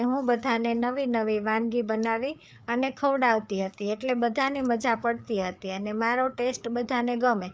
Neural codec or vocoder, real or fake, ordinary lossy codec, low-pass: codec, 16 kHz, 4 kbps, FreqCodec, larger model; fake; none; none